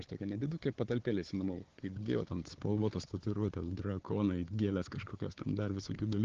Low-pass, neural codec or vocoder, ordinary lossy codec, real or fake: 7.2 kHz; codec, 16 kHz, 4 kbps, FunCodec, trained on Chinese and English, 50 frames a second; Opus, 16 kbps; fake